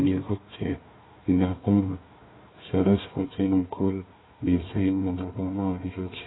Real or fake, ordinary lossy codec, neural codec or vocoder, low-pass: fake; AAC, 16 kbps; codec, 16 kHz, 1 kbps, FunCodec, trained on Chinese and English, 50 frames a second; 7.2 kHz